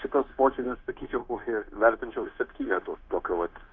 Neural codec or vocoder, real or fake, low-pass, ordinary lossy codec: codec, 16 kHz, 0.9 kbps, LongCat-Audio-Codec; fake; 7.2 kHz; Opus, 32 kbps